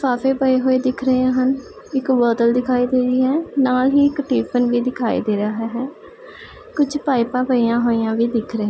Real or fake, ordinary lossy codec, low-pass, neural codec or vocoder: real; none; none; none